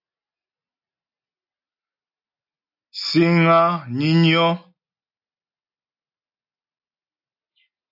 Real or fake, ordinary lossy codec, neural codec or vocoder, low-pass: real; AAC, 48 kbps; none; 5.4 kHz